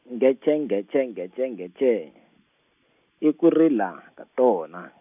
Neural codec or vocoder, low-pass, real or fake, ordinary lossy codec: none; 3.6 kHz; real; none